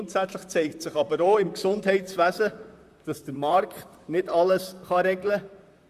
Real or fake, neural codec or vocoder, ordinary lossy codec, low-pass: fake; vocoder, 44.1 kHz, 128 mel bands, Pupu-Vocoder; Opus, 64 kbps; 14.4 kHz